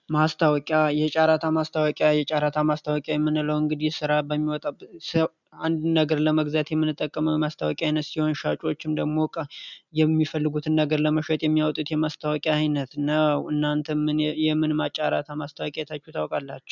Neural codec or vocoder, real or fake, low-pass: none; real; 7.2 kHz